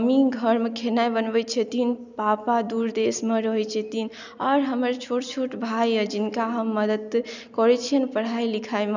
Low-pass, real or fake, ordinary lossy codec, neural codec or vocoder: 7.2 kHz; real; none; none